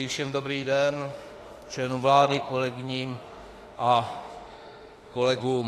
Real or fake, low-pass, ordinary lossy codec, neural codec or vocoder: fake; 14.4 kHz; AAC, 48 kbps; autoencoder, 48 kHz, 32 numbers a frame, DAC-VAE, trained on Japanese speech